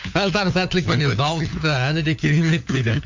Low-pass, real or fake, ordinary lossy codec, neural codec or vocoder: 7.2 kHz; fake; MP3, 64 kbps; codec, 16 kHz, 4 kbps, FunCodec, trained on LibriTTS, 50 frames a second